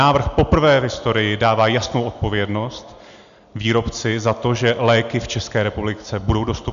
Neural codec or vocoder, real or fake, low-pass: none; real; 7.2 kHz